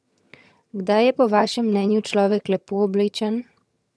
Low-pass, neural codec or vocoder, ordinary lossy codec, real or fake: none; vocoder, 22.05 kHz, 80 mel bands, HiFi-GAN; none; fake